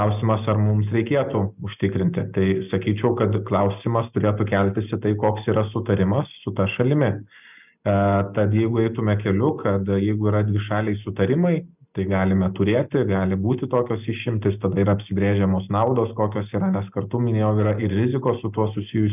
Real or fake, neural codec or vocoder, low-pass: real; none; 3.6 kHz